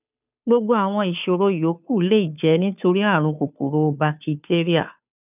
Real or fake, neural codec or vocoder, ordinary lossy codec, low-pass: fake; codec, 16 kHz, 2 kbps, FunCodec, trained on Chinese and English, 25 frames a second; none; 3.6 kHz